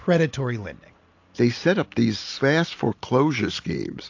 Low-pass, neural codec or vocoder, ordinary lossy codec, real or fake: 7.2 kHz; none; AAC, 48 kbps; real